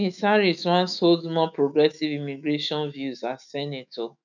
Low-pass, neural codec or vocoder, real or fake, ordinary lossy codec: 7.2 kHz; autoencoder, 48 kHz, 128 numbers a frame, DAC-VAE, trained on Japanese speech; fake; none